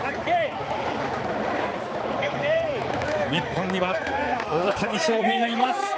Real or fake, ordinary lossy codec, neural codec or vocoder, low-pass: fake; none; codec, 16 kHz, 4 kbps, X-Codec, HuBERT features, trained on balanced general audio; none